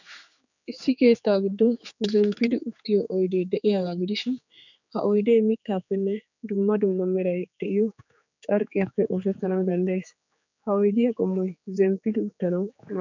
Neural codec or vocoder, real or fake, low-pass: codec, 16 kHz, 4 kbps, X-Codec, HuBERT features, trained on general audio; fake; 7.2 kHz